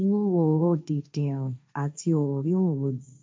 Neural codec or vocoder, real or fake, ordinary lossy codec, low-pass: codec, 16 kHz, 1.1 kbps, Voila-Tokenizer; fake; none; none